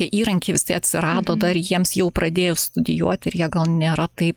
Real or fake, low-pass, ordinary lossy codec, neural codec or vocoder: real; 19.8 kHz; Opus, 32 kbps; none